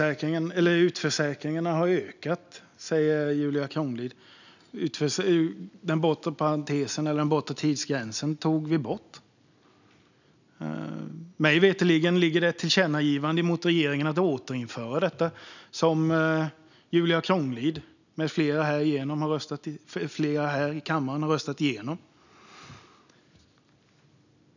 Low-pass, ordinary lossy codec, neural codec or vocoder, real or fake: 7.2 kHz; none; none; real